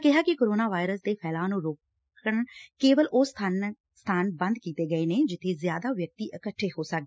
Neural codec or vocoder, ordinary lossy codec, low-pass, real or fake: none; none; none; real